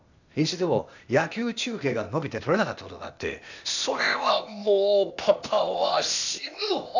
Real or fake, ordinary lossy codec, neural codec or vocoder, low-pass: fake; none; codec, 16 kHz, 0.8 kbps, ZipCodec; 7.2 kHz